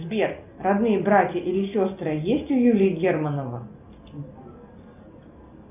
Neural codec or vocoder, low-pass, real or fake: none; 3.6 kHz; real